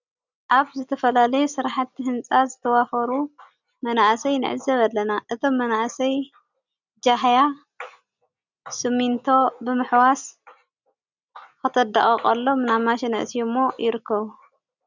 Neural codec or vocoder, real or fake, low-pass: none; real; 7.2 kHz